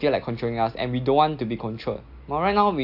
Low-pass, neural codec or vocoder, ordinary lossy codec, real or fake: 5.4 kHz; none; AAC, 48 kbps; real